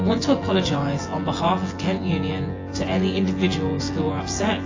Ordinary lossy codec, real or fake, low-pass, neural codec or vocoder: AAC, 32 kbps; fake; 7.2 kHz; vocoder, 24 kHz, 100 mel bands, Vocos